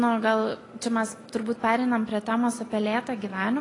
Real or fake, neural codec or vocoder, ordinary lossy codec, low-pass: real; none; AAC, 32 kbps; 10.8 kHz